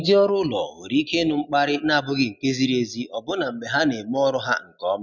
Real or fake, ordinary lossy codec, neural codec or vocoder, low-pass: real; none; none; 7.2 kHz